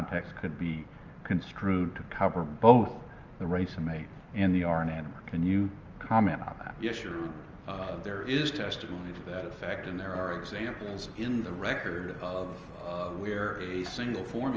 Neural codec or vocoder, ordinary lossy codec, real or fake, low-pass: none; Opus, 24 kbps; real; 7.2 kHz